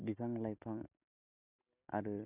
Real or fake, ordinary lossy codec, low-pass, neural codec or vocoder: real; none; 3.6 kHz; none